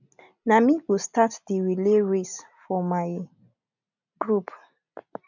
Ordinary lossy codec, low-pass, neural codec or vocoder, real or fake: none; 7.2 kHz; none; real